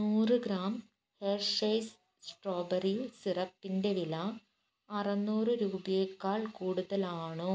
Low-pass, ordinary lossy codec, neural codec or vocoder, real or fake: none; none; none; real